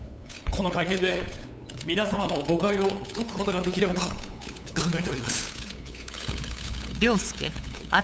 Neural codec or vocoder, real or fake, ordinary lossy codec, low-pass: codec, 16 kHz, 8 kbps, FunCodec, trained on LibriTTS, 25 frames a second; fake; none; none